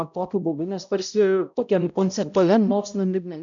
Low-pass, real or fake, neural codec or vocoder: 7.2 kHz; fake; codec, 16 kHz, 0.5 kbps, X-Codec, HuBERT features, trained on balanced general audio